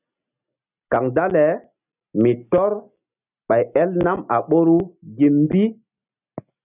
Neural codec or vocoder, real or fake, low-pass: none; real; 3.6 kHz